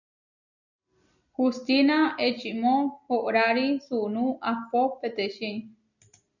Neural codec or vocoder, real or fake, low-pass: none; real; 7.2 kHz